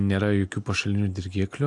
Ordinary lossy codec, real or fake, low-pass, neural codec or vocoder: AAC, 64 kbps; real; 10.8 kHz; none